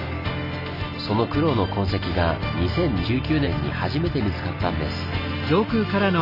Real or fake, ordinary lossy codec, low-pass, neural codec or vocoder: real; none; 5.4 kHz; none